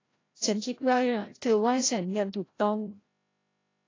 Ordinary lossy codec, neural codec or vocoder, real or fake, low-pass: AAC, 32 kbps; codec, 16 kHz, 0.5 kbps, FreqCodec, larger model; fake; 7.2 kHz